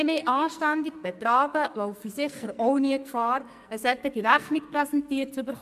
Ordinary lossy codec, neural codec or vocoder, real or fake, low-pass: MP3, 96 kbps; codec, 44.1 kHz, 2.6 kbps, SNAC; fake; 14.4 kHz